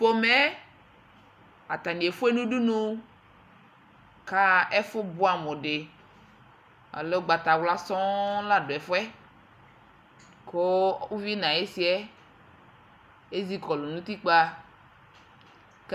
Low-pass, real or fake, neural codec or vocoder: 14.4 kHz; real; none